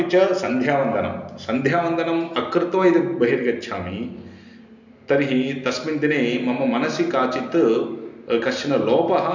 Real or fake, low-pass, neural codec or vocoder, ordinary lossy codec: real; 7.2 kHz; none; none